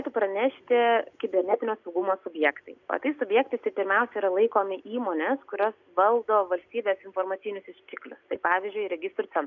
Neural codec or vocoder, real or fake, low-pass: none; real; 7.2 kHz